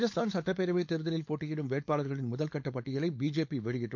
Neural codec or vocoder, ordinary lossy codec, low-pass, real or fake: codec, 16 kHz, 4.8 kbps, FACodec; MP3, 48 kbps; 7.2 kHz; fake